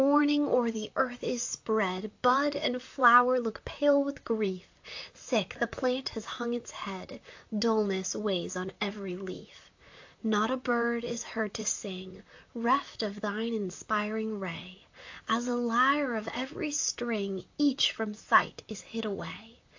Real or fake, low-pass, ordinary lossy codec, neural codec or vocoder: fake; 7.2 kHz; AAC, 48 kbps; vocoder, 44.1 kHz, 128 mel bands, Pupu-Vocoder